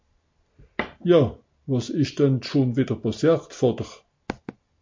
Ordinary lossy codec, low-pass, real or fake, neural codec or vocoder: MP3, 48 kbps; 7.2 kHz; real; none